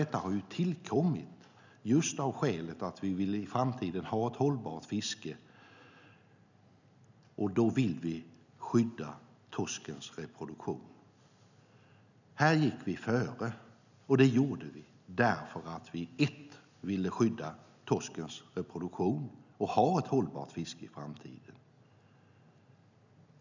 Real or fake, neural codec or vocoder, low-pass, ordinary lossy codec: real; none; 7.2 kHz; none